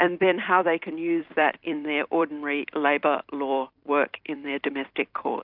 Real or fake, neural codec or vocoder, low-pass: fake; vocoder, 44.1 kHz, 128 mel bands every 256 samples, BigVGAN v2; 5.4 kHz